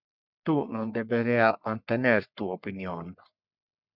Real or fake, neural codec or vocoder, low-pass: fake; codec, 44.1 kHz, 3.4 kbps, Pupu-Codec; 5.4 kHz